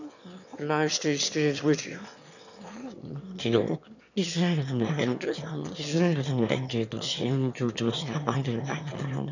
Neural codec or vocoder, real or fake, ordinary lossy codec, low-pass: autoencoder, 22.05 kHz, a latent of 192 numbers a frame, VITS, trained on one speaker; fake; none; 7.2 kHz